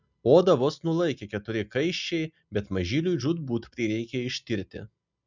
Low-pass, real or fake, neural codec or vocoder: 7.2 kHz; real; none